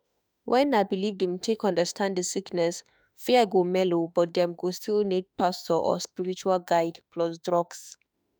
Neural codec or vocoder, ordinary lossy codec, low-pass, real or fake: autoencoder, 48 kHz, 32 numbers a frame, DAC-VAE, trained on Japanese speech; none; none; fake